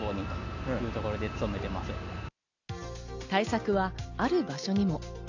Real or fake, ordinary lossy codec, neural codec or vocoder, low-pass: real; none; none; 7.2 kHz